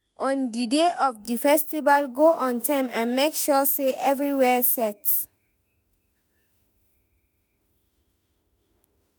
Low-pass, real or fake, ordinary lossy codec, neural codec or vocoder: none; fake; none; autoencoder, 48 kHz, 32 numbers a frame, DAC-VAE, trained on Japanese speech